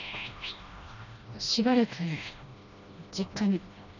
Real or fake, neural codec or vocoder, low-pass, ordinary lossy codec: fake; codec, 16 kHz, 1 kbps, FreqCodec, smaller model; 7.2 kHz; none